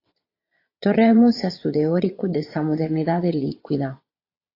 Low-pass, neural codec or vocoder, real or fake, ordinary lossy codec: 5.4 kHz; vocoder, 22.05 kHz, 80 mel bands, Vocos; fake; AAC, 32 kbps